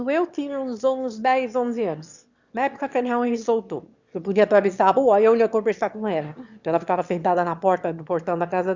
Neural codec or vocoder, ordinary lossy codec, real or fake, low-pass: autoencoder, 22.05 kHz, a latent of 192 numbers a frame, VITS, trained on one speaker; Opus, 64 kbps; fake; 7.2 kHz